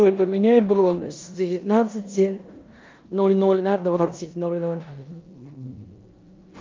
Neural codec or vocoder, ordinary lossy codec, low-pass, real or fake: codec, 16 kHz in and 24 kHz out, 0.9 kbps, LongCat-Audio-Codec, four codebook decoder; Opus, 24 kbps; 7.2 kHz; fake